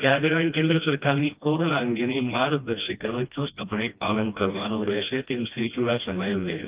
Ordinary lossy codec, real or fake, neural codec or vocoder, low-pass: Opus, 24 kbps; fake; codec, 16 kHz, 1 kbps, FreqCodec, smaller model; 3.6 kHz